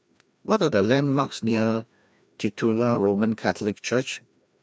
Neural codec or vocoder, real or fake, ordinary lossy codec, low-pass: codec, 16 kHz, 1 kbps, FreqCodec, larger model; fake; none; none